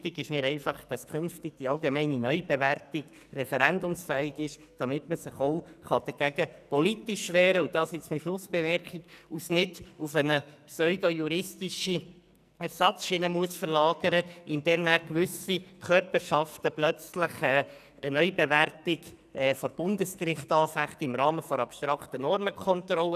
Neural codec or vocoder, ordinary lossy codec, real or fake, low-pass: codec, 44.1 kHz, 2.6 kbps, SNAC; none; fake; 14.4 kHz